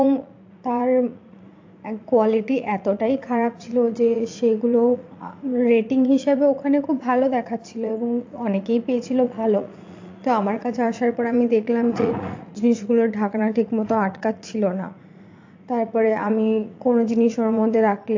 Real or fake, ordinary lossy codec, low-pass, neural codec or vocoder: fake; AAC, 48 kbps; 7.2 kHz; vocoder, 44.1 kHz, 80 mel bands, Vocos